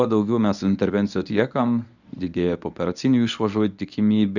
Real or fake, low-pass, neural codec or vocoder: fake; 7.2 kHz; codec, 24 kHz, 0.9 kbps, WavTokenizer, medium speech release version 1